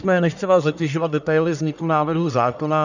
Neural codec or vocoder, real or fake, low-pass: codec, 44.1 kHz, 1.7 kbps, Pupu-Codec; fake; 7.2 kHz